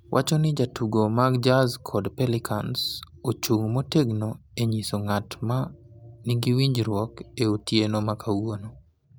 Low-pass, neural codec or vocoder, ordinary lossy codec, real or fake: none; none; none; real